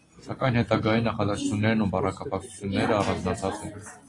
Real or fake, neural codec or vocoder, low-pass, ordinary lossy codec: real; none; 10.8 kHz; AAC, 32 kbps